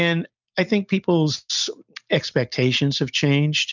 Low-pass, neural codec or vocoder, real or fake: 7.2 kHz; none; real